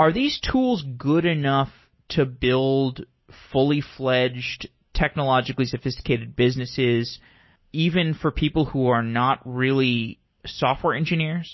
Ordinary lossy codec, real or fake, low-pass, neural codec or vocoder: MP3, 24 kbps; real; 7.2 kHz; none